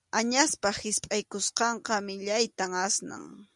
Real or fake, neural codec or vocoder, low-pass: real; none; 10.8 kHz